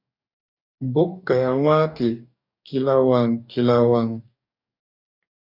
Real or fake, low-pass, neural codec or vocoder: fake; 5.4 kHz; codec, 44.1 kHz, 2.6 kbps, DAC